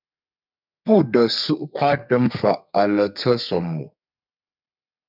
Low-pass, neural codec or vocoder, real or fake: 5.4 kHz; codec, 32 kHz, 1.9 kbps, SNAC; fake